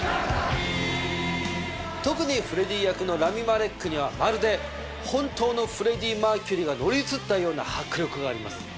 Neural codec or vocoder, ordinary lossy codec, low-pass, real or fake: none; none; none; real